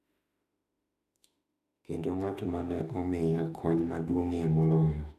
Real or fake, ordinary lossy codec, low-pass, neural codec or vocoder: fake; none; 14.4 kHz; autoencoder, 48 kHz, 32 numbers a frame, DAC-VAE, trained on Japanese speech